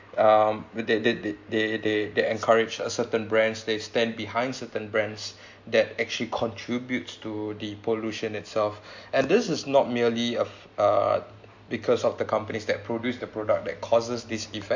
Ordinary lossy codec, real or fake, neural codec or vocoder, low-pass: MP3, 48 kbps; real; none; 7.2 kHz